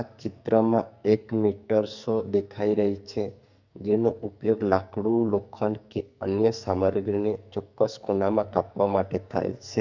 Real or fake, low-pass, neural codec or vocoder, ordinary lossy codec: fake; 7.2 kHz; codec, 44.1 kHz, 2.6 kbps, SNAC; none